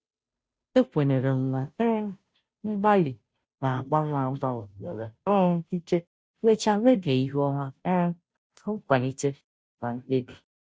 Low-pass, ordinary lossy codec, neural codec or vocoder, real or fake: none; none; codec, 16 kHz, 0.5 kbps, FunCodec, trained on Chinese and English, 25 frames a second; fake